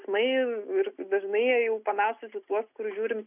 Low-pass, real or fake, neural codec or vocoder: 3.6 kHz; real; none